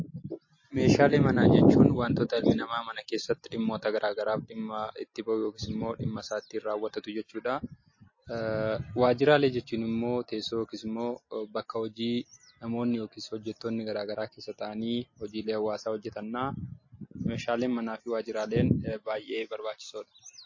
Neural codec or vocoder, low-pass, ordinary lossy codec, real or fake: none; 7.2 kHz; MP3, 32 kbps; real